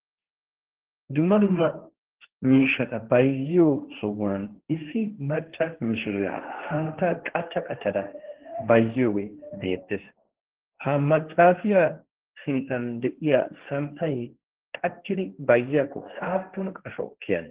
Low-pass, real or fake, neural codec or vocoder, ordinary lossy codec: 3.6 kHz; fake; codec, 16 kHz, 1.1 kbps, Voila-Tokenizer; Opus, 16 kbps